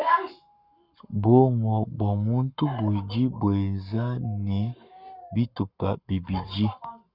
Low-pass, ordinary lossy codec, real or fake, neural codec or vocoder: 5.4 kHz; Opus, 64 kbps; fake; codec, 44.1 kHz, 7.8 kbps, Pupu-Codec